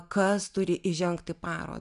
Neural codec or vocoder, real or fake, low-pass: none; real; 10.8 kHz